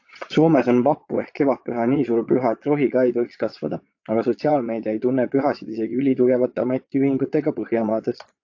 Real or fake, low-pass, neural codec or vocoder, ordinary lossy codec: fake; 7.2 kHz; vocoder, 22.05 kHz, 80 mel bands, Vocos; AAC, 48 kbps